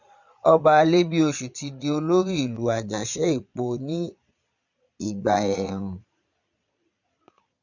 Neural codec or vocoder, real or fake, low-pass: vocoder, 22.05 kHz, 80 mel bands, Vocos; fake; 7.2 kHz